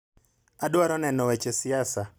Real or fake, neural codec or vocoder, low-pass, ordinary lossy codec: real; none; none; none